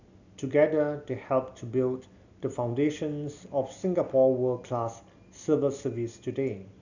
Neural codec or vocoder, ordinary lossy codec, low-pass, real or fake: none; none; 7.2 kHz; real